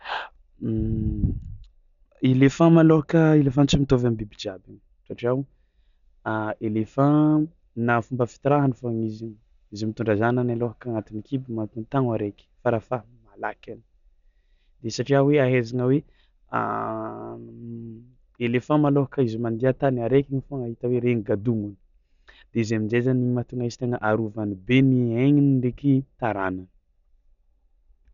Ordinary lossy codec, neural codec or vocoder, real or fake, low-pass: none; none; real; 7.2 kHz